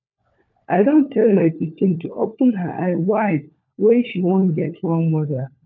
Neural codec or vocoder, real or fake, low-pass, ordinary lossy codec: codec, 16 kHz, 4 kbps, FunCodec, trained on LibriTTS, 50 frames a second; fake; 7.2 kHz; none